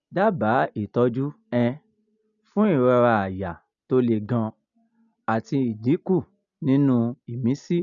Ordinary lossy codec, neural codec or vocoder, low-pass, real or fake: none; none; 7.2 kHz; real